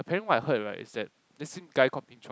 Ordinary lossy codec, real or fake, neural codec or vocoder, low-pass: none; real; none; none